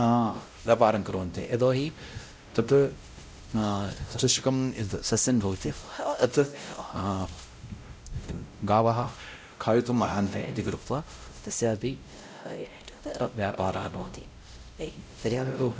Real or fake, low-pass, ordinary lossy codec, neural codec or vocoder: fake; none; none; codec, 16 kHz, 0.5 kbps, X-Codec, WavLM features, trained on Multilingual LibriSpeech